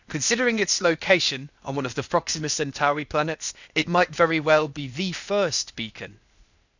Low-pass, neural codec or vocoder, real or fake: 7.2 kHz; codec, 16 kHz, 0.8 kbps, ZipCodec; fake